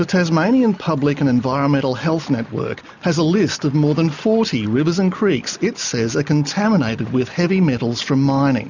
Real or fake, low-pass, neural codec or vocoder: real; 7.2 kHz; none